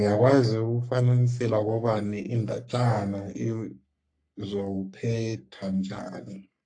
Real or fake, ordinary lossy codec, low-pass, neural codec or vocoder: fake; AAC, 64 kbps; 9.9 kHz; codec, 44.1 kHz, 3.4 kbps, Pupu-Codec